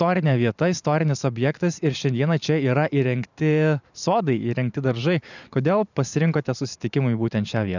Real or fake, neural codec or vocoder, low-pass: fake; vocoder, 44.1 kHz, 128 mel bands every 512 samples, BigVGAN v2; 7.2 kHz